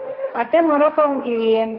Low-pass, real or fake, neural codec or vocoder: 5.4 kHz; fake; codec, 16 kHz, 1.1 kbps, Voila-Tokenizer